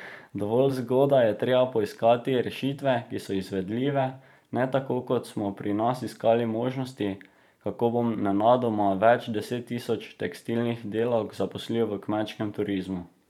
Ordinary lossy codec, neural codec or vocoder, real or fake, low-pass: none; vocoder, 44.1 kHz, 128 mel bands every 512 samples, BigVGAN v2; fake; 19.8 kHz